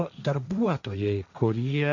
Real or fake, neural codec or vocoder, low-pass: fake; codec, 16 kHz, 1.1 kbps, Voila-Tokenizer; 7.2 kHz